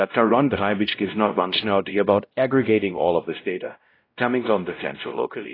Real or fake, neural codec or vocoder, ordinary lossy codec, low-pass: fake; codec, 16 kHz, 0.5 kbps, X-Codec, WavLM features, trained on Multilingual LibriSpeech; AAC, 24 kbps; 5.4 kHz